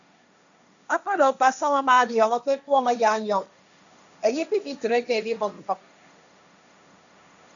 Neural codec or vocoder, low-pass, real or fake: codec, 16 kHz, 1.1 kbps, Voila-Tokenizer; 7.2 kHz; fake